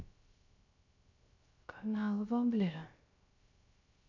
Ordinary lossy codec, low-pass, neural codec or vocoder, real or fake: none; 7.2 kHz; codec, 16 kHz, 0.3 kbps, FocalCodec; fake